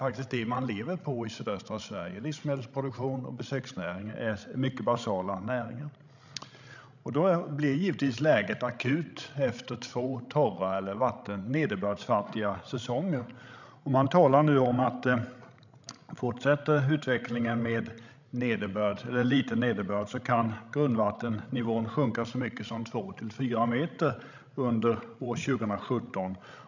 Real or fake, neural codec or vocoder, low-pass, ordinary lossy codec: fake; codec, 16 kHz, 16 kbps, FreqCodec, larger model; 7.2 kHz; none